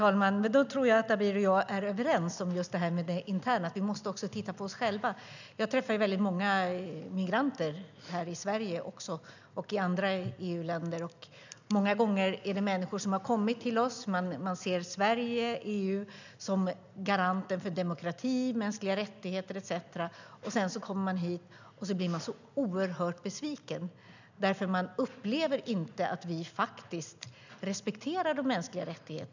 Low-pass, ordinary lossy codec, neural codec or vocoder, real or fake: 7.2 kHz; none; none; real